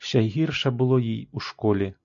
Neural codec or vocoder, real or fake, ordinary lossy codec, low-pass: none; real; AAC, 64 kbps; 7.2 kHz